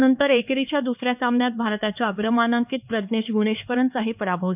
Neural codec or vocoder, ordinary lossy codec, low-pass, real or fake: codec, 16 kHz, 4 kbps, X-Codec, HuBERT features, trained on LibriSpeech; none; 3.6 kHz; fake